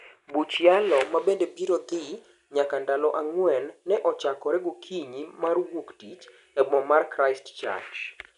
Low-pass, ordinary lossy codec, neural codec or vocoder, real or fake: 10.8 kHz; none; none; real